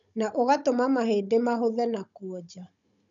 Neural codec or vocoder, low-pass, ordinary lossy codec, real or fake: codec, 16 kHz, 16 kbps, FunCodec, trained on Chinese and English, 50 frames a second; 7.2 kHz; MP3, 96 kbps; fake